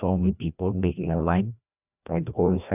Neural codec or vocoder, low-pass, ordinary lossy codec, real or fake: codec, 16 kHz, 1 kbps, FreqCodec, larger model; 3.6 kHz; none; fake